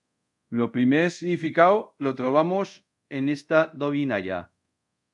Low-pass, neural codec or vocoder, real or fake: 10.8 kHz; codec, 24 kHz, 0.5 kbps, DualCodec; fake